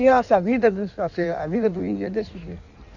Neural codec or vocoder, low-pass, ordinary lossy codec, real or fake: codec, 16 kHz in and 24 kHz out, 1.1 kbps, FireRedTTS-2 codec; 7.2 kHz; none; fake